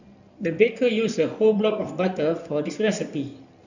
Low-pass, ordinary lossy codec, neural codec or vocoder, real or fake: 7.2 kHz; none; codec, 16 kHz in and 24 kHz out, 2.2 kbps, FireRedTTS-2 codec; fake